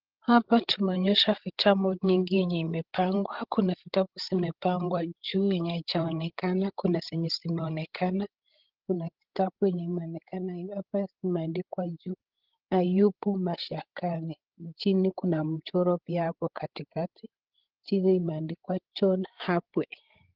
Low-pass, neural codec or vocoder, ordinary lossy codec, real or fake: 5.4 kHz; codec, 16 kHz, 16 kbps, FreqCodec, larger model; Opus, 16 kbps; fake